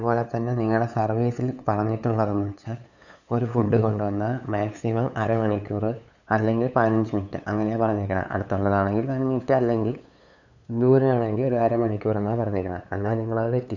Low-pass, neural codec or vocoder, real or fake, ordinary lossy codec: 7.2 kHz; codec, 16 kHz, 8 kbps, FunCodec, trained on LibriTTS, 25 frames a second; fake; none